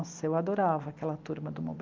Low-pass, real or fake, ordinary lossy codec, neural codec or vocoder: 7.2 kHz; real; Opus, 32 kbps; none